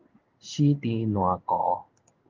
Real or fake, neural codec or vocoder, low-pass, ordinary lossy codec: fake; codec, 16 kHz in and 24 kHz out, 1 kbps, XY-Tokenizer; 7.2 kHz; Opus, 32 kbps